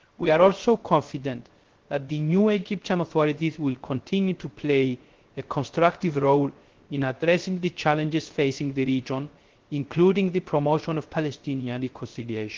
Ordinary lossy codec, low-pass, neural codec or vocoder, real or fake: Opus, 16 kbps; 7.2 kHz; codec, 16 kHz, 0.3 kbps, FocalCodec; fake